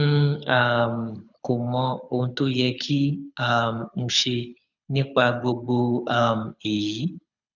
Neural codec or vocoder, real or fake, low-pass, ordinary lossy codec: codec, 24 kHz, 6 kbps, HILCodec; fake; 7.2 kHz; none